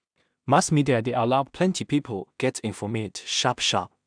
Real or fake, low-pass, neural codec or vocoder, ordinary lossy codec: fake; 9.9 kHz; codec, 16 kHz in and 24 kHz out, 0.4 kbps, LongCat-Audio-Codec, two codebook decoder; none